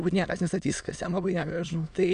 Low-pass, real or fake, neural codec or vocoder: 9.9 kHz; fake; autoencoder, 22.05 kHz, a latent of 192 numbers a frame, VITS, trained on many speakers